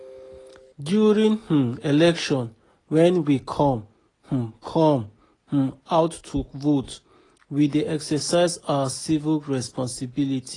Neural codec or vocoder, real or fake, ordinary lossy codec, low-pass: none; real; AAC, 32 kbps; 10.8 kHz